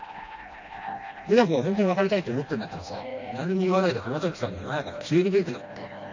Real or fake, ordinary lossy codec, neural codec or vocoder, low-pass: fake; AAC, 48 kbps; codec, 16 kHz, 1 kbps, FreqCodec, smaller model; 7.2 kHz